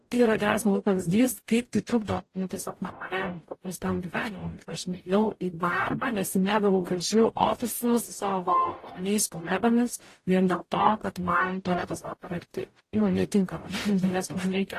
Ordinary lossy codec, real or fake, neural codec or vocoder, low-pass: AAC, 48 kbps; fake; codec, 44.1 kHz, 0.9 kbps, DAC; 14.4 kHz